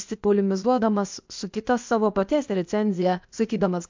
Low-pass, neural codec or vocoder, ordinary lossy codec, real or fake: 7.2 kHz; codec, 16 kHz, 0.8 kbps, ZipCodec; MP3, 64 kbps; fake